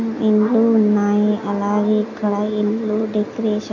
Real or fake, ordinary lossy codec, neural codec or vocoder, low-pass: real; AAC, 48 kbps; none; 7.2 kHz